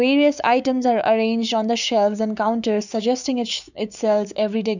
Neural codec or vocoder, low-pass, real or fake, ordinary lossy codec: codec, 16 kHz, 6 kbps, DAC; 7.2 kHz; fake; none